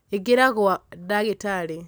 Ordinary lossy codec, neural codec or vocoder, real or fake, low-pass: none; none; real; none